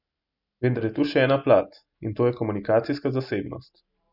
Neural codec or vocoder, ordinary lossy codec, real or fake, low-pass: none; none; real; 5.4 kHz